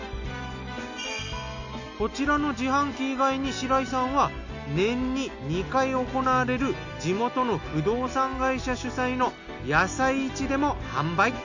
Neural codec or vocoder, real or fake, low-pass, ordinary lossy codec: none; real; 7.2 kHz; none